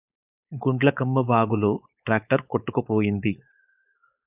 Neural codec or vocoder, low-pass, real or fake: codec, 16 kHz, 4.8 kbps, FACodec; 3.6 kHz; fake